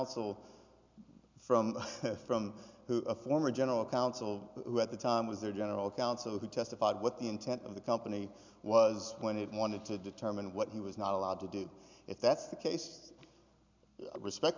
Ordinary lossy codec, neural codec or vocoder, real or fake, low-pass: MP3, 64 kbps; none; real; 7.2 kHz